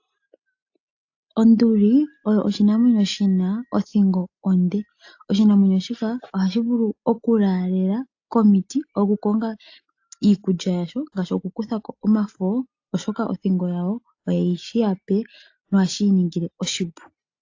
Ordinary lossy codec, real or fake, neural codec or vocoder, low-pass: AAC, 48 kbps; real; none; 7.2 kHz